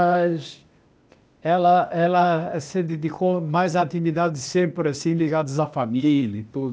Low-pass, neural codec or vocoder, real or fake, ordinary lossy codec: none; codec, 16 kHz, 0.8 kbps, ZipCodec; fake; none